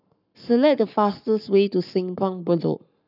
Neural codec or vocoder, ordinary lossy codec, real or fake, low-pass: codec, 44.1 kHz, 7.8 kbps, Pupu-Codec; none; fake; 5.4 kHz